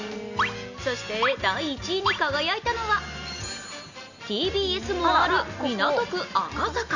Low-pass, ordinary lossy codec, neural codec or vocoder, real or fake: 7.2 kHz; none; none; real